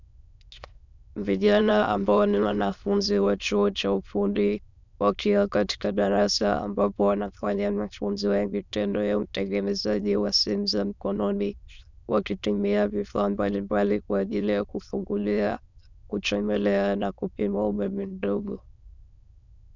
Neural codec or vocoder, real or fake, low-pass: autoencoder, 22.05 kHz, a latent of 192 numbers a frame, VITS, trained on many speakers; fake; 7.2 kHz